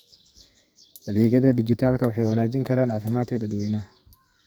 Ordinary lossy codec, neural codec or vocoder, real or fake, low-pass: none; codec, 44.1 kHz, 2.6 kbps, SNAC; fake; none